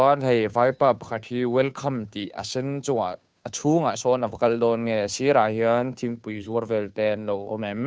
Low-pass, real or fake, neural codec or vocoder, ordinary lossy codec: none; fake; codec, 16 kHz, 2 kbps, FunCodec, trained on Chinese and English, 25 frames a second; none